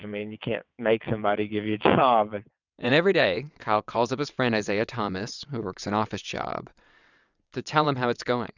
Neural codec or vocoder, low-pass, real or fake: vocoder, 22.05 kHz, 80 mel bands, WaveNeXt; 7.2 kHz; fake